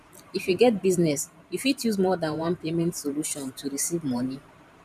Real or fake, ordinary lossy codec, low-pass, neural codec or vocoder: fake; none; 14.4 kHz; vocoder, 44.1 kHz, 128 mel bands every 512 samples, BigVGAN v2